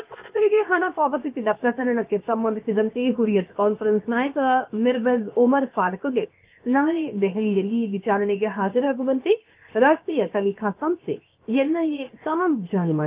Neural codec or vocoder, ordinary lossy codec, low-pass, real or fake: codec, 16 kHz, 0.7 kbps, FocalCodec; Opus, 24 kbps; 3.6 kHz; fake